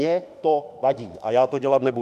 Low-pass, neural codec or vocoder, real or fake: 14.4 kHz; autoencoder, 48 kHz, 32 numbers a frame, DAC-VAE, trained on Japanese speech; fake